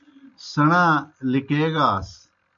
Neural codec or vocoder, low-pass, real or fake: none; 7.2 kHz; real